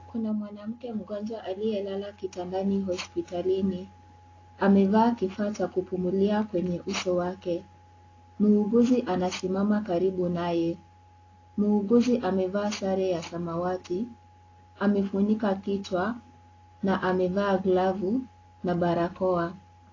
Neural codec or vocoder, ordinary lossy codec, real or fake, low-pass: none; AAC, 32 kbps; real; 7.2 kHz